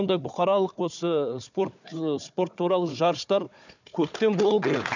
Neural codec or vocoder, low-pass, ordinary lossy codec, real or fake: codec, 16 kHz, 4 kbps, FunCodec, trained on Chinese and English, 50 frames a second; 7.2 kHz; none; fake